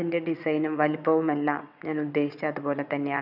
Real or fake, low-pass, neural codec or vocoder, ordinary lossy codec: fake; 5.4 kHz; vocoder, 22.05 kHz, 80 mel bands, WaveNeXt; none